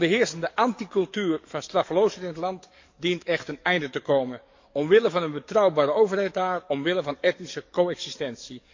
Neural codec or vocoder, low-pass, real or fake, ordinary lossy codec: codec, 44.1 kHz, 7.8 kbps, DAC; 7.2 kHz; fake; MP3, 48 kbps